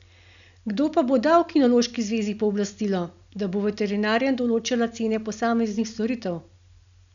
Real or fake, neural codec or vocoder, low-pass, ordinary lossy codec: real; none; 7.2 kHz; none